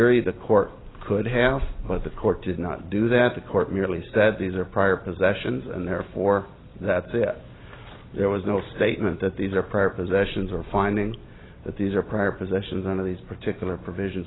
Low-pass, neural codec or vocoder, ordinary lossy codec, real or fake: 7.2 kHz; none; AAC, 16 kbps; real